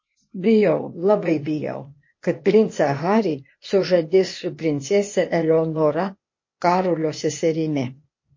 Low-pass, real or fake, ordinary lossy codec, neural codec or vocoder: 7.2 kHz; fake; MP3, 32 kbps; codec, 16 kHz, 0.8 kbps, ZipCodec